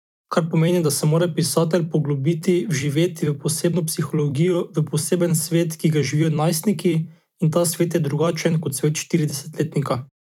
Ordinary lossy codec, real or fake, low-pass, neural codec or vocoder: none; fake; 19.8 kHz; vocoder, 44.1 kHz, 128 mel bands every 256 samples, BigVGAN v2